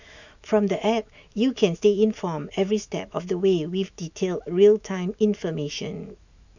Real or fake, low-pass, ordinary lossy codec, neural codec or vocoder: fake; 7.2 kHz; none; autoencoder, 48 kHz, 128 numbers a frame, DAC-VAE, trained on Japanese speech